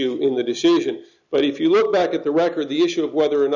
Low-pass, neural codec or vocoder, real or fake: 7.2 kHz; none; real